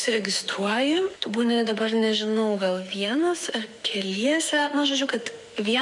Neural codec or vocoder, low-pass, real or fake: autoencoder, 48 kHz, 32 numbers a frame, DAC-VAE, trained on Japanese speech; 10.8 kHz; fake